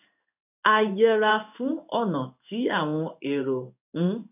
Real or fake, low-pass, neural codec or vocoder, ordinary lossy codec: fake; 3.6 kHz; codec, 16 kHz in and 24 kHz out, 1 kbps, XY-Tokenizer; none